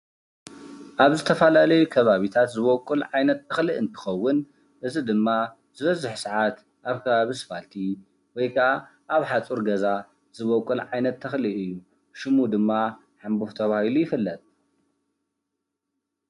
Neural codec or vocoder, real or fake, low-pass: none; real; 10.8 kHz